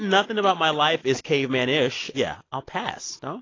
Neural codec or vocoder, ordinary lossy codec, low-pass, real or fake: none; AAC, 32 kbps; 7.2 kHz; real